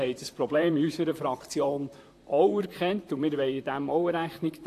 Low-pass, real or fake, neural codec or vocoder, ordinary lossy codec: 14.4 kHz; fake; vocoder, 44.1 kHz, 128 mel bands, Pupu-Vocoder; AAC, 64 kbps